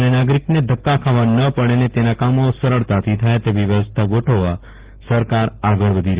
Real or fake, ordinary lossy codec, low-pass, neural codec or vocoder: real; Opus, 16 kbps; 3.6 kHz; none